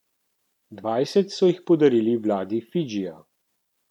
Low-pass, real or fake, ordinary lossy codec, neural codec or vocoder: 19.8 kHz; real; none; none